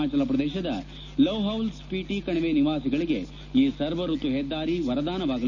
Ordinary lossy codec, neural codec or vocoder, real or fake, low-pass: none; none; real; 7.2 kHz